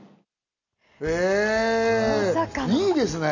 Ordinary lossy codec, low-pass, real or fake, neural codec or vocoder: none; 7.2 kHz; real; none